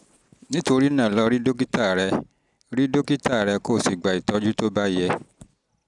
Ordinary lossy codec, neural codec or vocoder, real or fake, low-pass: none; none; real; 10.8 kHz